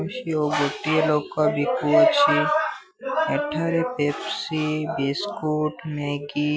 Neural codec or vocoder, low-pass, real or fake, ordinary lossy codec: none; none; real; none